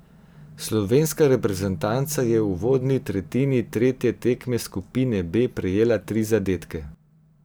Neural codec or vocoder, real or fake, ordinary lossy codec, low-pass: vocoder, 44.1 kHz, 128 mel bands every 512 samples, BigVGAN v2; fake; none; none